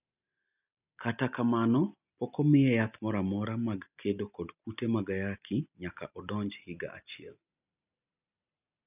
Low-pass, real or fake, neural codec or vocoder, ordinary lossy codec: 3.6 kHz; real; none; none